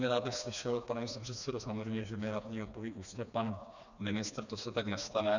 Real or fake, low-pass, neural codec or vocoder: fake; 7.2 kHz; codec, 16 kHz, 2 kbps, FreqCodec, smaller model